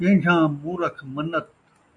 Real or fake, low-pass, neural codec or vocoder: real; 10.8 kHz; none